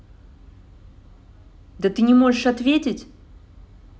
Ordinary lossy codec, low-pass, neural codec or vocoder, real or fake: none; none; none; real